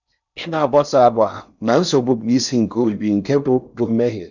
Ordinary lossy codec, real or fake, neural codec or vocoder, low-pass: none; fake; codec, 16 kHz in and 24 kHz out, 0.6 kbps, FocalCodec, streaming, 4096 codes; 7.2 kHz